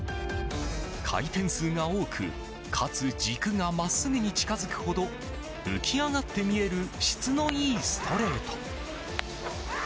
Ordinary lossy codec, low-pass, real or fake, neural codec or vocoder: none; none; real; none